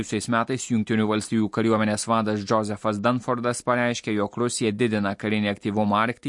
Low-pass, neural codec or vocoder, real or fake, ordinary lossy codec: 10.8 kHz; none; real; MP3, 48 kbps